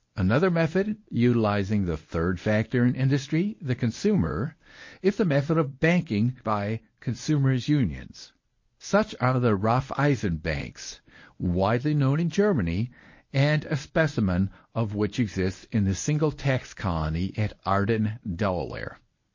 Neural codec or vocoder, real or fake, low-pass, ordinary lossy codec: codec, 24 kHz, 0.9 kbps, WavTokenizer, medium speech release version 1; fake; 7.2 kHz; MP3, 32 kbps